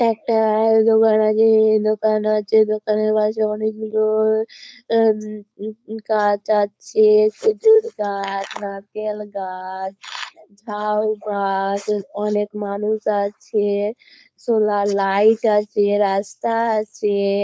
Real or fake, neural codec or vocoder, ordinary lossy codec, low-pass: fake; codec, 16 kHz, 4.8 kbps, FACodec; none; none